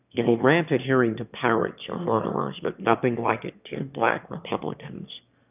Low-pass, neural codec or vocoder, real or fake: 3.6 kHz; autoencoder, 22.05 kHz, a latent of 192 numbers a frame, VITS, trained on one speaker; fake